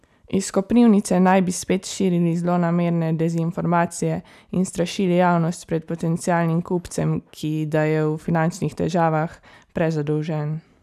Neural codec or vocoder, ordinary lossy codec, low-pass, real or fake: none; none; 14.4 kHz; real